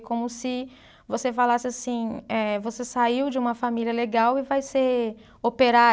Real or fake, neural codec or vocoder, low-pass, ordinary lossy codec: real; none; none; none